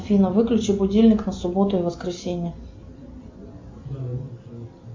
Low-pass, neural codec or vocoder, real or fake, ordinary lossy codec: 7.2 kHz; none; real; MP3, 64 kbps